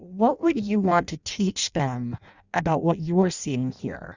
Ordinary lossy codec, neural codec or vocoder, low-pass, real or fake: Opus, 64 kbps; codec, 16 kHz in and 24 kHz out, 0.6 kbps, FireRedTTS-2 codec; 7.2 kHz; fake